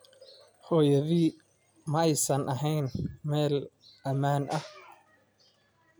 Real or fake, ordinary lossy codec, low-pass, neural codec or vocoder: real; none; none; none